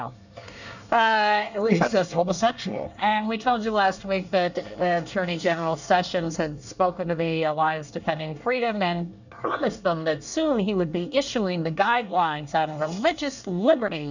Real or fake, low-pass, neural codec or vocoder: fake; 7.2 kHz; codec, 24 kHz, 1 kbps, SNAC